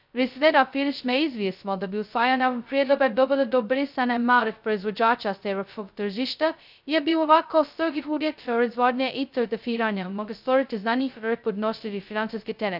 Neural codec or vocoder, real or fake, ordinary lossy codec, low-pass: codec, 16 kHz, 0.2 kbps, FocalCodec; fake; none; 5.4 kHz